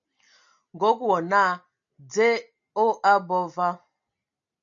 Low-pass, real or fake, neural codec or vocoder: 7.2 kHz; real; none